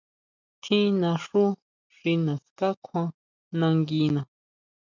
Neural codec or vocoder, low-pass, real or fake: none; 7.2 kHz; real